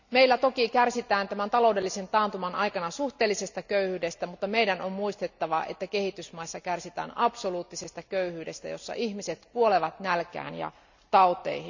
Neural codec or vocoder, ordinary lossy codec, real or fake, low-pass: none; none; real; 7.2 kHz